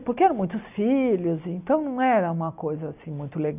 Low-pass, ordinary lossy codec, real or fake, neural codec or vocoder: 3.6 kHz; none; real; none